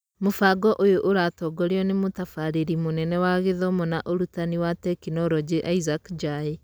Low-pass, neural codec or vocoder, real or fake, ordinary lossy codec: none; none; real; none